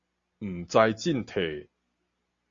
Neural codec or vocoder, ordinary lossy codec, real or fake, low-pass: none; Opus, 64 kbps; real; 7.2 kHz